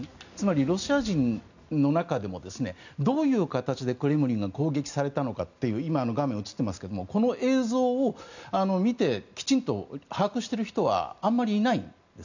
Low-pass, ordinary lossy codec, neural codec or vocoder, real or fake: 7.2 kHz; none; none; real